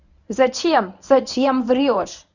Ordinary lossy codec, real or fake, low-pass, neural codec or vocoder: none; fake; 7.2 kHz; codec, 24 kHz, 0.9 kbps, WavTokenizer, medium speech release version 1